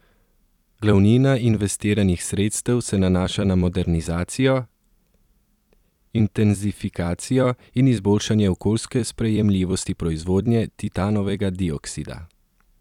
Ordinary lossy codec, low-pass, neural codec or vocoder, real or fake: none; 19.8 kHz; vocoder, 44.1 kHz, 128 mel bands every 256 samples, BigVGAN v2; fake